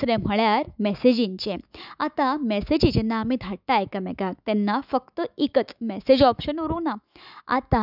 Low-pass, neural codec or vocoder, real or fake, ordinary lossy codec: 5.4 kHz; autoencoder, 48 kHz, 128 numbers a frame, DAC-VAE, trained on Japanese speech; fake; none